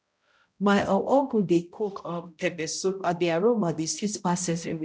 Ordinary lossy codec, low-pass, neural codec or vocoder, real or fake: none; none; codec, 16 kHz, 0.5 kbps, X-Codec, HuBERT features, trained on balanced general audio; fake